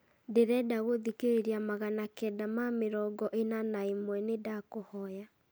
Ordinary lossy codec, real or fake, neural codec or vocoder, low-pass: none; real; none; none